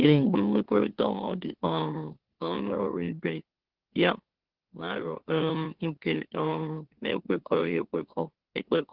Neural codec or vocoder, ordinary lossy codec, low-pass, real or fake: autoencoder, 44.1 kHz, a latent of 192 numbers a frame, MeloTTS; Opus, 16 kbps; 5.4 kHz; fake